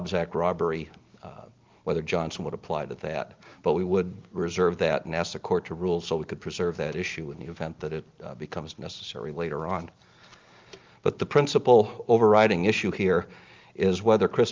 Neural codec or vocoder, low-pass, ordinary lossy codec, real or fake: none; 7.2 kHz; Opus, 24 kbps; real